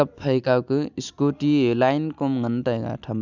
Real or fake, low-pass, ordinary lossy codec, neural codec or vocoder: real; 7.2 kHz; none; none